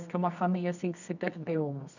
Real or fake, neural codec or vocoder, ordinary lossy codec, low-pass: fake; codec, 24 kHz, 0.9 kbps, WavTokenizer, medium music audio release; none; 7.2 kHz